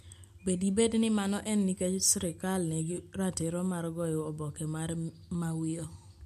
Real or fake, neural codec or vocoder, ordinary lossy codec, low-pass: real; none; MP3, 64 kbps; 14.4 kHz